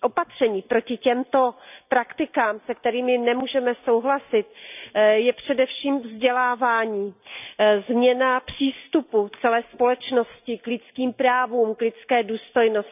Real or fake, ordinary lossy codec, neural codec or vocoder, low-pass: real; none; none; 3.6 kHz